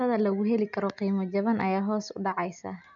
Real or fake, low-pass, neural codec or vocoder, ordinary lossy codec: real; 7.2 kHz; none; none